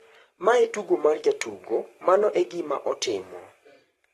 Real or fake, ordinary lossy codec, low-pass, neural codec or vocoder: fake; AAC, 32 kbps; 19.8 kHz; codec, 44.1 kHz, 7.8 kbps, Pupu-Codec